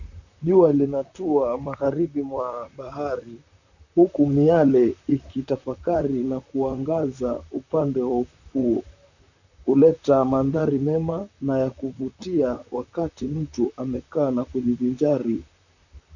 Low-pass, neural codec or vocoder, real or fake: 7.2 kHz; vocoder, 44.1 kHz, 128 mel bands, Pupu-Vocoder; fake